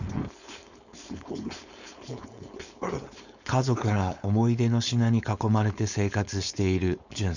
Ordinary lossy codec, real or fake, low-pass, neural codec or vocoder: none; fake; 7.2 kHz; codec, 16 kHz, 4.8 kbps, FACodec